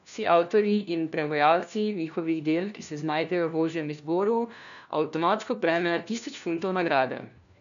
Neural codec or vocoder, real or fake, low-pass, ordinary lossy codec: codec, 16 kHz, 1 kbps, FunCodec, trained on LibriTTS, 50 frames a second; fake; 7.2 kHz; none